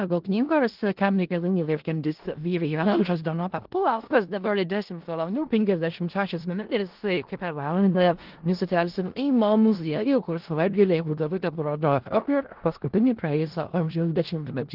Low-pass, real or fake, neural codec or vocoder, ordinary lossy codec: 5.4 kHz; fake; codec, 16 kHz in and 24 kHz out, 0.4 kbps, LongCat-Audio-Codec, four codebook decoder; Opus, 16 kbps